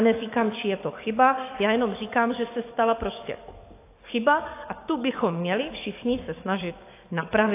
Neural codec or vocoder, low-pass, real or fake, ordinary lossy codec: codec, 44.1 kHz, 7.8 kbps, DAC; 3.6 kHz; fake; AAC, 24 kbps